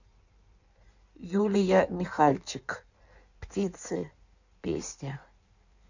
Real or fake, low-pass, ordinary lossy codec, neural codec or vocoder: fake; 7.2 kHz; none; codec, 16 kHz in and 24 kHz out, 1.1 kbps, FireRedTTS-2 codec